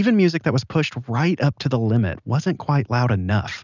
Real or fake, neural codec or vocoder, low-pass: real; none; 7.2 kHz